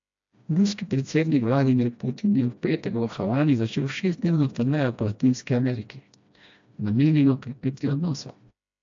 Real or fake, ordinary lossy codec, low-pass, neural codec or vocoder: fake; AAC, 64 kbps; 7.2 kHz; codec, 16 kHz, 1 kbps, FreqCodec, smaller model